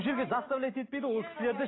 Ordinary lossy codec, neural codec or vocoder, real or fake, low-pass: AAC, 16 kbps; none; real; 7.2 kHz